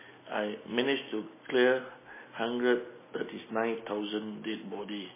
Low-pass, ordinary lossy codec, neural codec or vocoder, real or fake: 3.6 kHz; MP3, 16 kbps; none; real